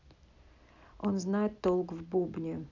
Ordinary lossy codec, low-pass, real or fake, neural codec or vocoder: none; 7.2 kHz; real; none